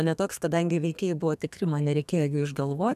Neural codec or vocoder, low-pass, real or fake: codec, 32 kHz, 1.9 kbps, SNAC; 14.4 kHz; fake